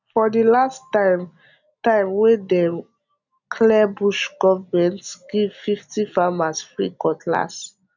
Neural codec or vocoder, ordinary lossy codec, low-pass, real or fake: none; none; 7.2 kHz; real